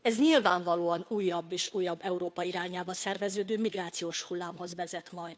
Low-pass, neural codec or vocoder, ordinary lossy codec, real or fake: none; codec, 16 kHz, 2 kbps, FunCodec, trained on Chinese and English, 25 frames a second; none; fake